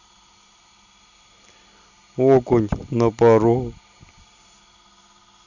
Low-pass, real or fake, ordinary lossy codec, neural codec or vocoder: 7.2 kHz; real; none; none